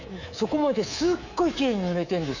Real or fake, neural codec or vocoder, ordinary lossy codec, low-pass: fake; codec, 24 kHz, 3.1 kbps, DualCodec; none; 7.2 kHz